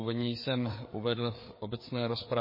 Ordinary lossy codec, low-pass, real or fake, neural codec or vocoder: MP3, 24 kbps; 5.4 kHz; fake; codec, 16 kHz, 4 kbps, FreqCodec, larger model